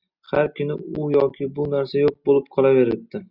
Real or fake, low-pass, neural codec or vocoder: real; 5.4 kHz; none